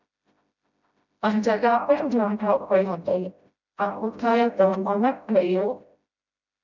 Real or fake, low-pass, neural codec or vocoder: fake; 7.2 kHz; codec, 16 kHz, 0.5 kbps, FreqCodec, smaller model